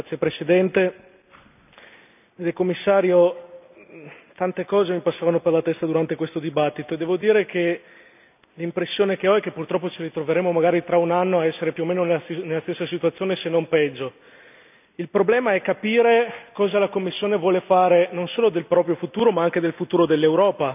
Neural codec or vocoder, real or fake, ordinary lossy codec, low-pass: none; real; none; 3.6 kHz